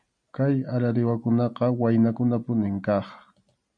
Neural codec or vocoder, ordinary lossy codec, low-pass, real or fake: none; MP3, 64 kbps; 9.9 kHz; real